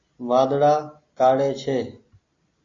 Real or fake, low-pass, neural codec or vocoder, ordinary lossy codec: real; 7.2 kHz; none; AAC, 32 kbps